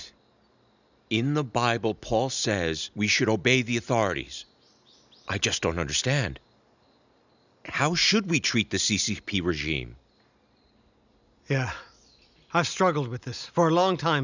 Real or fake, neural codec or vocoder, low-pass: real; none; 7.2 kHz